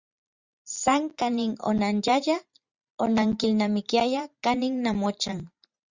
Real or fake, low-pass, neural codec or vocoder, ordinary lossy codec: fake; 7.2 kHz; vocoder, 44.1 kHz, 128 mel bands every 512 samples, BigVGAN v2; Opus, 64 kbps